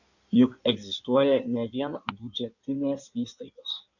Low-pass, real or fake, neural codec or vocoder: 7.2 kHz; fake; codec, 16 kHz in and 24 kHz out, 1.1 kbps, FireRedTTS-2 codec